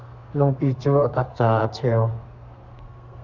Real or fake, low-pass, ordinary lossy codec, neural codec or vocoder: fake; 7.2 kHz; Opus, 64 kbps; codec, 32 kHz, 1.9 kbps, SNAC